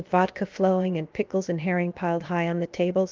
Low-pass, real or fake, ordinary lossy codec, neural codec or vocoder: 7.2 kHz; fake; Opus, 32 kbps; codec, 16 kHz, 0.7 kbps, FocalCodec